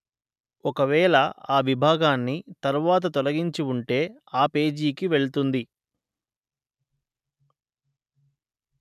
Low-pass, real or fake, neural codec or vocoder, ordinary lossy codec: 14.4 kHz; real; none; none